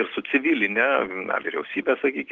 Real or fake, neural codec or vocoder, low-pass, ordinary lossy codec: real; none; 7.2 kHz; Opus, 16 kbps